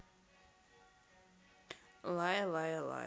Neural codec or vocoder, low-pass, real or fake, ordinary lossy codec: none; none; real; none